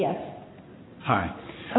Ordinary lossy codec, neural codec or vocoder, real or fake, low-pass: AAC, 16 kbps; none; real; 7.2 kHz